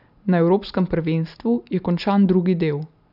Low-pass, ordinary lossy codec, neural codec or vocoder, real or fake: 5.4 kHz; none; none; real